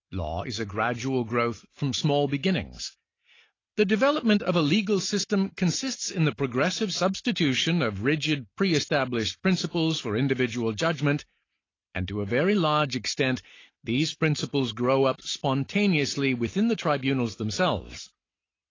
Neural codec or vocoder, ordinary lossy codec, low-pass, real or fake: none; AAC, 32 kbps; 7.2 kHz; real